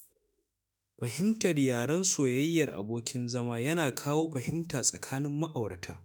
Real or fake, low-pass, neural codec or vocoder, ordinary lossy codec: fake; none; autoencoder, 48 kHz, 32 numbers a frame, DAC-VAE, trained on Japanese speech; none